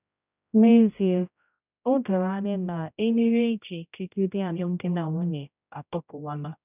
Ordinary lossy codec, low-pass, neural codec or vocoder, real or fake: none; 3.6 kHz; codec, 16 kHz, 0.5 kbps, X-Codec, HuBERT features, trained on general audio; fake